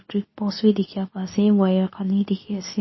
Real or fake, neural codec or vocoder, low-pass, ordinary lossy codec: fake; codec, 24 kHz, 0.9 kbps, WavTokenizer, medium speech release version 2; 7.2 kHz; MP3, 24 kbps